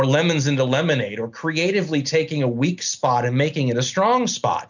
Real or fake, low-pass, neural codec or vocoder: real; 7.2 kHz; none